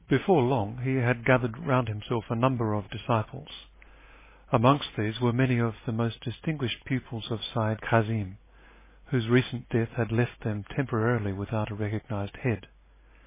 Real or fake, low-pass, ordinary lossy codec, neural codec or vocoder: real; 3.6 kHz; MP3, 16 kbps; none